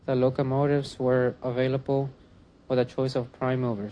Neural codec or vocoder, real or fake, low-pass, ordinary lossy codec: none; real; 9.9 kHz; AAC, 48 kbps